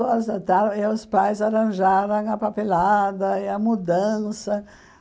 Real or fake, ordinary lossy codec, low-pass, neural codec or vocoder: real; none; none; none